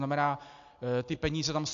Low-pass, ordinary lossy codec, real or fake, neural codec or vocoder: 7.2 kHz; AAC, 64 kbps; real; none